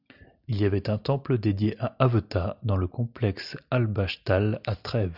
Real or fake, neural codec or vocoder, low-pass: real; none; 5.4 kHz